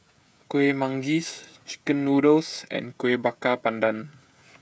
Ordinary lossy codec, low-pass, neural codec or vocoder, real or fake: none; none; codec, 16 kHz, 16 kbps, FreqCodec, smaller model; fake